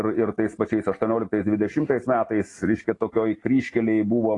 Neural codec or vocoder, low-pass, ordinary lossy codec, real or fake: none; 10.8 kHz; AAC, 32 kbps; real